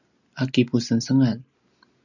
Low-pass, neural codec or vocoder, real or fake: 7.2 kHz; none; real